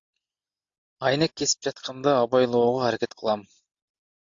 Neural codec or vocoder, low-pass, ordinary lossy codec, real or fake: none; 7.2 kHz; AAC, 64 kbps; real